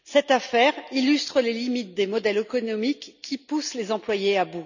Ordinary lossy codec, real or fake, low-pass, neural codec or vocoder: none; real; 7.2 kHz; none